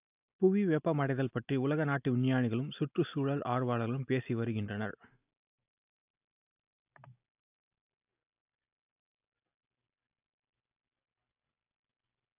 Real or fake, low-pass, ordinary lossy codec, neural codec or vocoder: real; 3.6 kHz; AAC, 32 kbps; none